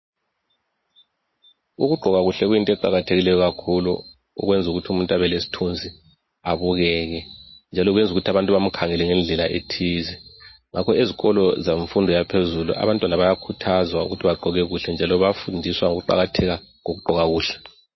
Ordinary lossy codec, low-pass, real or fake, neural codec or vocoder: MP3, 24 kbps; 7.2 kHz; real; none